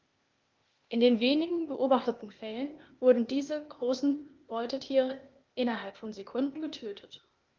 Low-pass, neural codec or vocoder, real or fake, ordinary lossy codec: 7.2 kHz; codec, 16 kHz, 0.8 kbps, ZipCodec; fake; Opus, 24 kbps